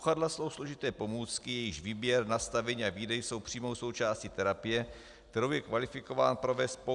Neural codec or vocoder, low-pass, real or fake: none; 10.8 kHz; real